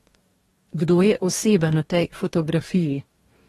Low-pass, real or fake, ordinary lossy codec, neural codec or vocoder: 19.8 kHz; fake; AAC, 32 kbps; codec, 44.1 kHz, 2.6 kbps, DAC